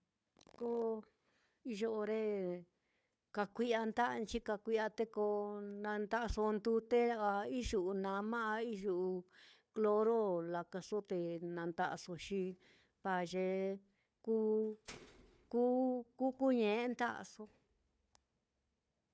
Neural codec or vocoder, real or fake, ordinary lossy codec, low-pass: codec, 16 kHz, 4 kbps, FunCodec, trained on Chinese and English, 50 frames a second; fake; none; none